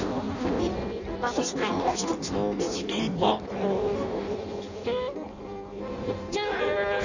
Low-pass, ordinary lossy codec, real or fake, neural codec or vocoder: 7.2 kHz; none; fake; codec, 16 kHz in and 24 kHz out, 0.6 kbps, FireRedTTS-2 codec